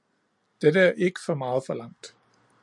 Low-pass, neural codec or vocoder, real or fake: 10.8 kHz; none; real